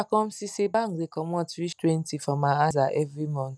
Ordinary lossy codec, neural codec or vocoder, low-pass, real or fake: none; none; none; real